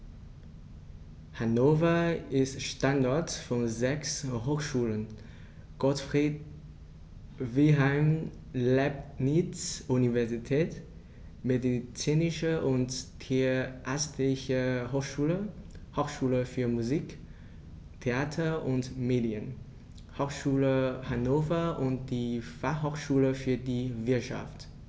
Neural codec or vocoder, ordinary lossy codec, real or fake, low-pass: none; none; real; none